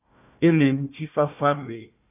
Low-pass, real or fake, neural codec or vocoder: 3.6 kHz; fake; codec, 16 kHz, 1 kbps, FunCodec, trained on Chinese and English, 50 frames a second